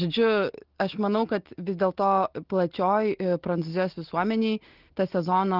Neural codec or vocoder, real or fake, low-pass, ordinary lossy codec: none; real; 5.4 kHz; Opus, 16 kbps